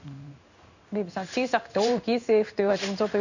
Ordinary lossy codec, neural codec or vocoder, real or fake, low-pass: none; codec, 16 kHz in and 24 kHz out, 1 kbps, XY-Tokenizer; fake; 7.2 kHz